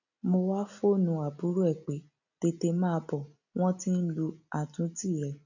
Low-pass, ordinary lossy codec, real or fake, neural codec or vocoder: 7.2 kHz; none; real; none